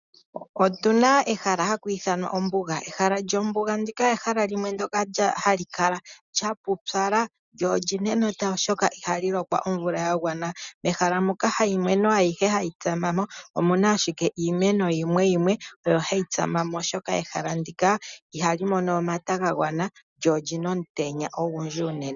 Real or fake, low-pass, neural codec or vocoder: real; 7.2 kHz; none